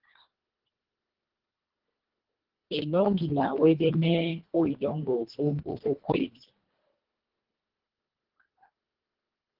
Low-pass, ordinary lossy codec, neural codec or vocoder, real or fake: 5.4 kHz; Opus, 16 kbps; codec, 24 kHz, 1.5 kbps, HILCodec; fake